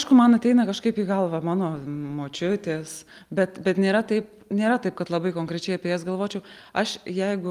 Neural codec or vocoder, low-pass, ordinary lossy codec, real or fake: none; 14.4 kHz; Opus, 32 kbps; real